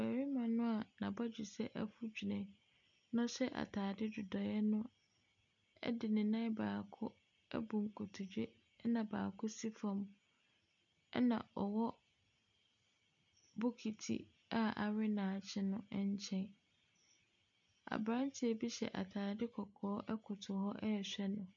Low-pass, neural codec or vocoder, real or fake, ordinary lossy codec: 7.2 kHz; none; real; MP3, 96 kbps